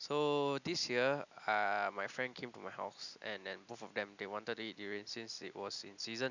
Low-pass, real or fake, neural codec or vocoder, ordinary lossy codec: 7.2 kHz; real; none; none